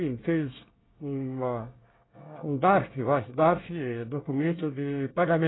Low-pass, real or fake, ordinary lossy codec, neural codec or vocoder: 7.2 kHz; fake; AAC, 16 kbps; codec, 24 kHz, 1 kbps, SNAC